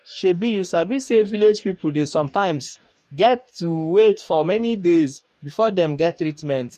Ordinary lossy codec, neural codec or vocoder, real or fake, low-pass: MP3, 64 kbps; codec, 44.1 kHz, 2.6 kbps, DAC; fake; 14.4 kHz